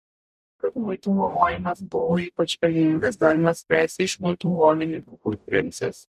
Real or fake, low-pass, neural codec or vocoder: fake; 14.4 kHz; codec, 44.1 kHz, 0.9 kbps, DAC